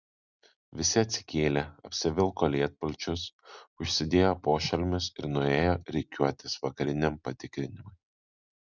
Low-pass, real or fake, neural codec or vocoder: 7.2 kHz; real; none